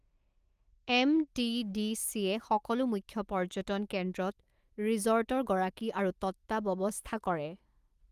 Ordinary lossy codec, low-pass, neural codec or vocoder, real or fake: Opus, 24 kbps; 14.4 kHz; autoencoder, 48 kHz, 128 numbers a frame, DAC-VAE, trained on Japanese speech; fake